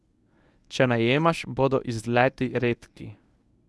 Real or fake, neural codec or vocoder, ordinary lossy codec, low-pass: fake; codec, 24 kHz, 0.9 kbps, WavTokenizer, medium speech release version 1; none; none